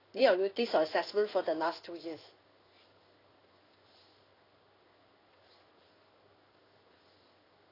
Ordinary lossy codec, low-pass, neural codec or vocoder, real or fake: AAC, 24 kbps; 5.4 kHz; codec, 16 kHz in and 24 kHz out, 1 kbps, XY-Tokenizer; fake